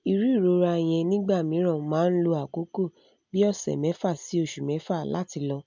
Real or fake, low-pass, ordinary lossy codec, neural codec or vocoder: real; 7.2 kHz; MP3, 64 kbps; none